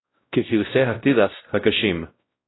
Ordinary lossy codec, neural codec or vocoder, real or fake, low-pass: AAC, 16 kbps; codec, 16 kHz, 0.5 kbps, X-Codec, WavLM features, trained on Multilingual LibriSpeech; fake; 7.2 kHz